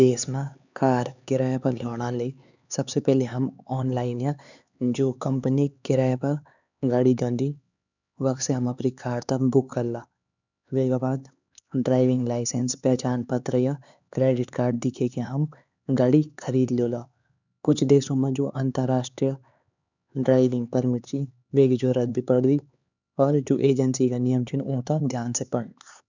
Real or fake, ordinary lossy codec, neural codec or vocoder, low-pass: fake; none; codec, 16 kHz, 4 kbps, X-Codec, HuBERT features, trained on LibriSpeech; 7.2 kHz